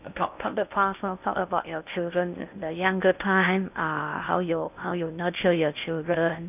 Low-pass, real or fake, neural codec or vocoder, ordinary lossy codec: 3.6 kHz; fake; codec, 16 kHz in and 24 kHz out, 0.6 kbps, FocalCodec, streaming, 2048 codes; none